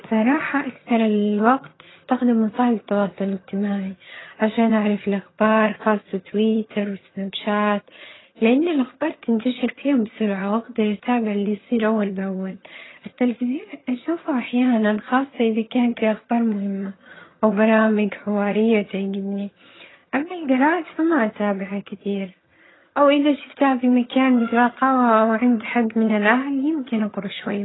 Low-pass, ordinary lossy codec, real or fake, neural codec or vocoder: 7.2 kHz; AAC, 16 kbps; fake; vocoder, 22.05 kHz, 80 mel bands, HiFi-GAN